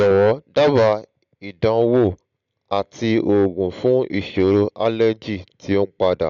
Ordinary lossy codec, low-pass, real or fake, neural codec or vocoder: none; 7.2 kHz; real; none